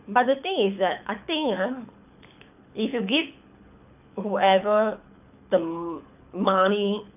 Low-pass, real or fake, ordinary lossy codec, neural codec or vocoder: 3.6 kHz; fake; none; codec, 24 kHz, 6 kbps, HILCodec